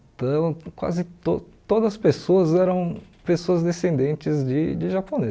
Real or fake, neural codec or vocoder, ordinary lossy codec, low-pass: real; none; none; none